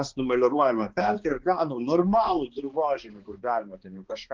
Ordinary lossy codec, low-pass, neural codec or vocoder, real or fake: Opus, 16 kbps; 7.2 kHz; codec, 16 kHz, 2 kbps, X-Codec, HuBERT features, trained on balanced general audio; fake